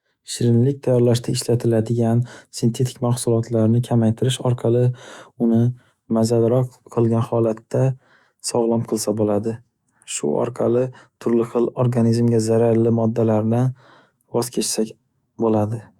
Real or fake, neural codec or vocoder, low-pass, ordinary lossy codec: fake; autoencoder, 48 kHz, 128 numbers a frame, DAC-VAE, trained on Japanese speech; 19.8 kHz; Opus, 64 kbps